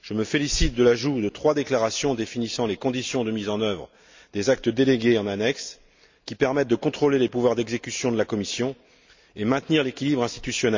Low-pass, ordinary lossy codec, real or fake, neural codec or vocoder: 7.2 kHz; MP3, 64 kbps; real; none